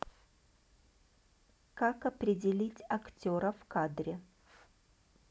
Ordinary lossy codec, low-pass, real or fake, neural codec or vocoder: none; none; real; none